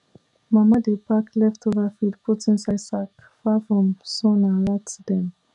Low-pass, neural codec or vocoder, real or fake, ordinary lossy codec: 10.8 kHz; codec, 44.1 kHz, 7.8 kbps, DAC; fake; none